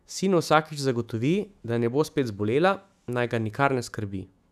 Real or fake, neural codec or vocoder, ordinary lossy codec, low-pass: fake; autoencoder, 48 kHz, 128 numbers a frame, DAC-VAE, trained on Japanese speech; none; 14.4 kHz